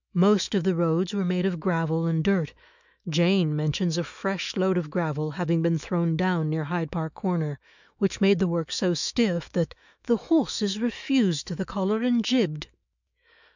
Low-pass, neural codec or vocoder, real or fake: 7.2 kHz; autoencoder, 48 kHz, 128 numbers a frame, DAC-VAE, trained on Japanese speech; fake